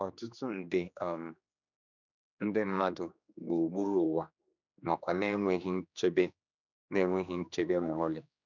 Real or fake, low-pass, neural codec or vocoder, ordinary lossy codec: fake; 7.2 kHz; codec, 16 kHz, 2 kbps, X-Codec, HuBERT features, trained on general audio; none